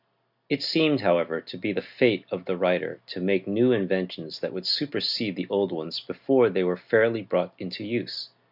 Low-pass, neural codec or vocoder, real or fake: 5.4 kHz; none; real